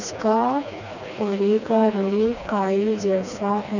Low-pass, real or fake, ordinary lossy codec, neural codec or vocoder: 7.2 kHz; fake; none; codec, 16 kHz, 2 kbps, FreqCodec, smaller model